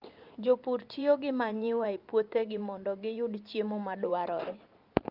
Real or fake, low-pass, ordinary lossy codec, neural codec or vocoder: fake; 5.4 kHz; Opus, 24 kbps; vocoder, 44.1 kHz, 128 mel bands every 512 samples, BigVGAN v2